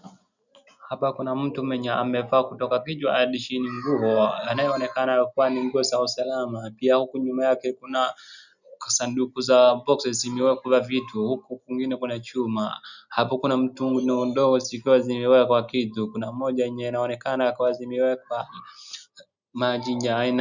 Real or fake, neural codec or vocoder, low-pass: real; none; 7.2 kHz